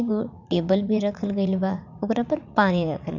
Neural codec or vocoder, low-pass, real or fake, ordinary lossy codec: vocoder, 44.1 kHz, 80 mel bands, Vocos; 7.2 kHz; fake; none